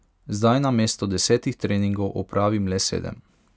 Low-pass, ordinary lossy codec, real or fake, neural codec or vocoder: none; none; real; none